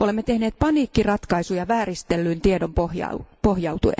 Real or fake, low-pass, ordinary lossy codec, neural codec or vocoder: real; none; none; none